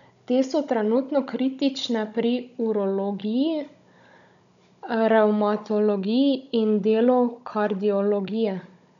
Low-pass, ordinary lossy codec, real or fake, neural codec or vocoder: 7.2 kHz; none; fake; codec, 16 kHz, 16 kbps, FunCodec, trained on Chinese and English, 50 frames a second